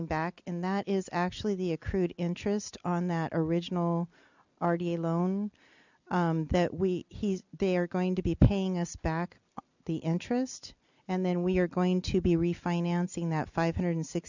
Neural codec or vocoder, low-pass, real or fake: none; 7.2 kHz; real